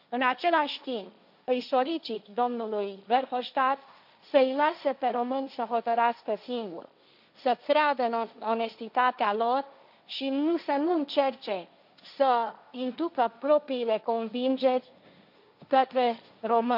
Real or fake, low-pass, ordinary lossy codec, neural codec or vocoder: fake; 5.4 kHz; none; codec, 16 kHz, 1.1 kbps, Voila-Tokenizer